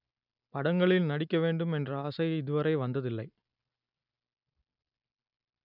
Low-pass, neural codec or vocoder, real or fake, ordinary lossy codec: 5.4 kHz; none; real; none